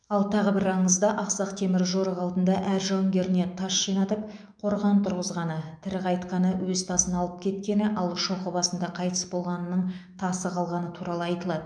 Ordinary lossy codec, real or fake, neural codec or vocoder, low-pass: none; fake; autoencoder, 48 kHz, 128 numbers a frame, DAC-VAE, trained on Japanese speech; 9.9 kHz